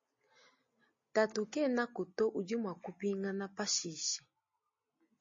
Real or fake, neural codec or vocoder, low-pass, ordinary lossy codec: real; none; 7.2 kHz; MP3, 32 kbps